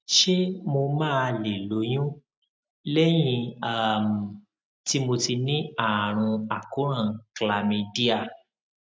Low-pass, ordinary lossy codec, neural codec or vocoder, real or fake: none; none; none; real